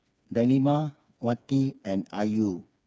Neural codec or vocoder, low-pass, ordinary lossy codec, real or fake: codec, 16 kHz, 4 kbps, FreqCodec, smaller model; none; none; fake